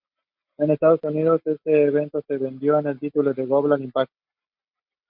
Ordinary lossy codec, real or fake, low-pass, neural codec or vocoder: MP3, 48 kbps; real; 5.4 kHz; none